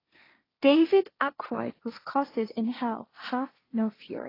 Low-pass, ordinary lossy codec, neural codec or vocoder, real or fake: 5.4 kHz; AAC, 24 kbps; codec, 16 kHz, 1.1 kbps, Voila-Tokenizer; fake